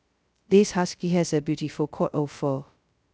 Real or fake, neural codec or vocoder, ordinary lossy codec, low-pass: fake; codec, 16 kHz, 0.2 kbps, FocalCodec; none; none